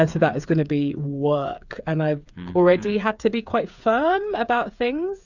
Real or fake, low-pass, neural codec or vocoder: fake; 7.2 kHz; codec, 16 kHz, 8 kbps, FreqCodec, smaller model